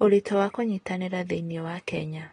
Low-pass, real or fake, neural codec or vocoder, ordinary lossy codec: 9.9 kHz; real; none; AAC, 32 kbps